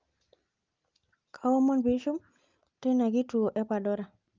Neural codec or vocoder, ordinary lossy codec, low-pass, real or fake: none; Opus, 32 kbps; 7.2 kHz; real